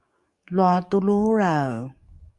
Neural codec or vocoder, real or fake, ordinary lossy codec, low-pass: codec, 44.1 kHz, 7.8 kbps, DAC; fake; Opus, 64 kbps; 10.8 kHz